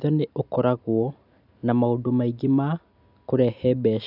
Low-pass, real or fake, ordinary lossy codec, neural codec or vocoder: 5.4 kHz; real; none; none